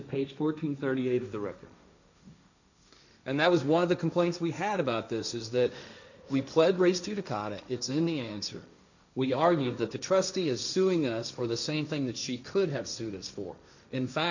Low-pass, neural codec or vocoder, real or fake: 7.2 kHz; codec, 16 kHz, 1.1 kbps, Voila-Tokenizer; fake